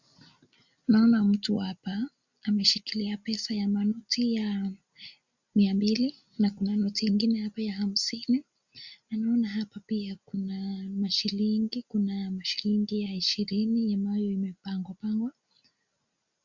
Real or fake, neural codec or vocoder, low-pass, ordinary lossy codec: real; none; 7.2 kHz; Opus, 64 kbps